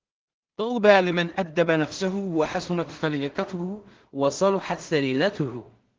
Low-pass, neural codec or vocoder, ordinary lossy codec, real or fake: 7.2 kHz; codec, 16 kHz in and 24 kHz out, 0.4 kbps, LongCat-Audio-Codec, two codebook decoder; Opus, 16 kbps; fake